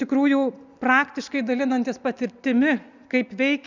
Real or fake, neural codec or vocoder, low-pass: real; none; 7.2 kHz